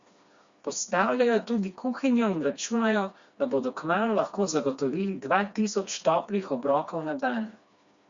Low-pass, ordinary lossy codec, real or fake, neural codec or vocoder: 7.2 kHz; Opus, 64 kbps; fake; codec, 16 kHz, 2 kbps, FreqCodec, smaller model